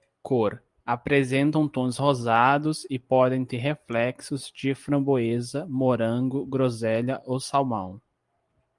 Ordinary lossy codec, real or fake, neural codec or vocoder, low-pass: Opus, 32 kbps; real; none; 10.8 kHz